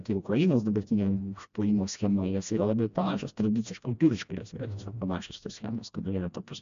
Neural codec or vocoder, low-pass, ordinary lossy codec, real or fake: codec, 16 kHz, 1 kbps, FreqCodec, smaller model; 7.2 kHz; MP3, 64 kbps; fake